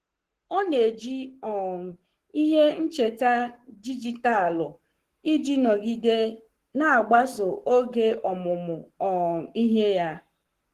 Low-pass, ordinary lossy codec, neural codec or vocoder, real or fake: 14.4 kHz; Opus, 16 kbps; codec, 44.1 kHz, 7.8 kbps, Pupu-Codec; fake